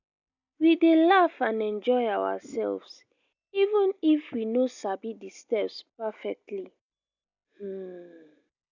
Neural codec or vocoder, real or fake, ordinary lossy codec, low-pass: none; real; none; 7.2 kHz